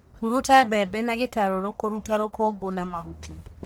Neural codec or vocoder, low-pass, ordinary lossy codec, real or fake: codec, 44.1 kHz, 1.7 kbps, Pupu-Codec; none; none; fake